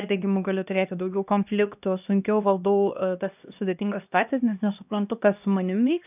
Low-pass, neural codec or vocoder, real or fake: 3.6 kHz; codec, 16 kHz, 1 kbps, X-Codec, HuBERT features, trained on LibriSpeech; fake